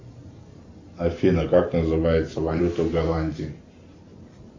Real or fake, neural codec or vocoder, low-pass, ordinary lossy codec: fake; vocoder, 44.1 kHz, 128 mel bands every 512 samples, BigVGAN v2; 7.2 kHz; MP3, 48 kbps